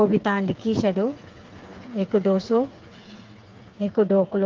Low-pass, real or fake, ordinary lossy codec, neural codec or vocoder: 7.2 kHz; fake; Opus, 32 kbps; codec, 16 kHz, 4 kbps, FreqCodec, smaller model